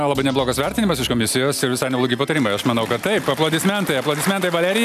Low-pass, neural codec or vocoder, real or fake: 14.4 kHz; none; real